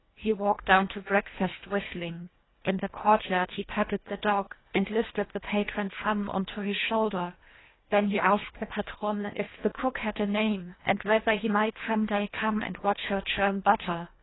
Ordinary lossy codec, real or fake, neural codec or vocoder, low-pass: AAC, 16 kbps; fake; codec, 24 kHz, 1.5 kbps, HILCodec; 7.2 kHz